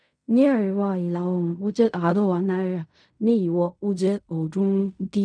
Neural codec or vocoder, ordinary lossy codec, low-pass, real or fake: codec, 16 kHz in and 24 kHz out, 0.4 kbps, LongCat-Audio-Codec, fine tuned four codebook decoder; none; 9.9 kHz; fake